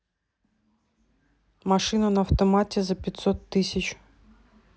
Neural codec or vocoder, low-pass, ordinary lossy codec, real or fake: none; none; none; real